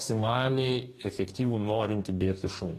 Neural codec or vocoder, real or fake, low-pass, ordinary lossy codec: codec, 44.1 kHz, 2.6 kbps, DAC; fake; 14.4 kHz; AAC, 48 kbps